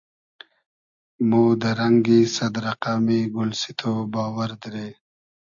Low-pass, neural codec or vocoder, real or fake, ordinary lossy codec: 7.2 kHz; none; real; AAC, 64 kbps